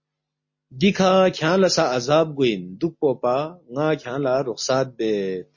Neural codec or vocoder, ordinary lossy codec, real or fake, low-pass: none; MP3, 32 kbps; real; 7.2 kHz